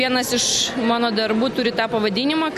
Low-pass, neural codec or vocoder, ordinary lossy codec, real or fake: 14.4 kHz; none; AAC, 96 kbps; real